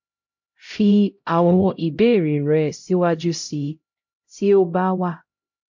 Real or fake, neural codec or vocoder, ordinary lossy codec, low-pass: fake; codec, 16 kHz, 0.5 kbps, X-Codec, HuBERT features, trained on LibriSpeech; MP3, 48 kbps; 7.2 kHz